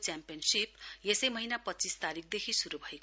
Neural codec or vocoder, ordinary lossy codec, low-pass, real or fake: none; none; none; real